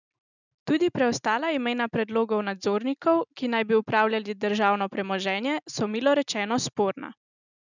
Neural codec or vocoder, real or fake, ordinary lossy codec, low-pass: none; real; none; 7.2 kHz